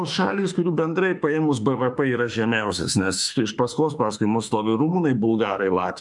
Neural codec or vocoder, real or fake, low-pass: autoencoder, 48 kHz, 32 numbers a frame, DAC-VAE, trained on Japanese speech; fake; 10.8 kHz